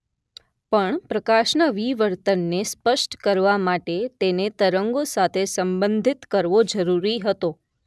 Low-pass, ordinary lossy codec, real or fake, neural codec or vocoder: none; none; real; none